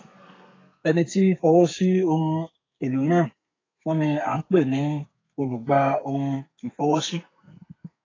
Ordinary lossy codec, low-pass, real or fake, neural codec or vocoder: AAC, 32 kbps; 7.2 kHz; fake; codec, 32 kHz, 1.9 kbps, SNAC